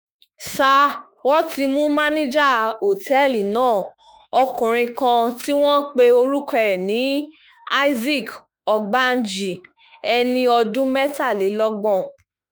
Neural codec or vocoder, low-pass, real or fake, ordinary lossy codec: autoencoder, 48 kHz, 32 numbers a frame, DAC-VAE, trained on Japanese speech; none; fake; none